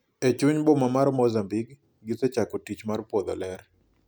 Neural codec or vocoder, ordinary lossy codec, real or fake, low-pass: none; none; real; none